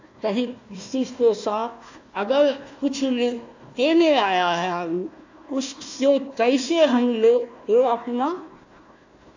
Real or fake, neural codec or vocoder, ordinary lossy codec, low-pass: fake; codec, 16 kHz, 1 kbps, FunCodec, trained on Chinese and English, 50 frames a second; AAC, 48 kbps; 7.2 kHz